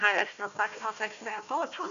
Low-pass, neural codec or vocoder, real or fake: 7.2 kHz; codec, 16 kHz, 1 kbps, FunCodec, trained on Chinese and English, 50 frames a second; fake